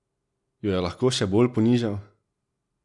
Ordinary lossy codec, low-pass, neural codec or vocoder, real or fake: none; 10.8 kHz; none; real